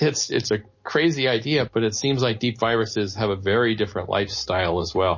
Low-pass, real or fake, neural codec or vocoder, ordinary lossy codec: 7.2 kHz; real; none; MP3, 32 kbps